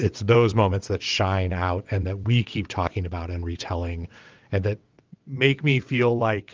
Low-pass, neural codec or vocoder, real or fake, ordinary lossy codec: 7.2 kHz; vocoder, 22.05 kHz, 80 mel bands, WaveNeXt; fake; Opus, 24 kbps